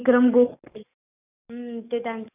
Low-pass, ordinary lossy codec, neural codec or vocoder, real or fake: 3.6 kHz; none; codec, 44.1 kHz, 7.8 kbps, DAC; fake